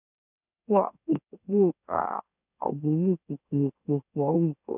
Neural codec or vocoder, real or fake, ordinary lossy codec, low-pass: autoencoder, 44.1 kHz, a latent of 192 numbers a frame, MeloTTS; fake; none; 3.6 kHz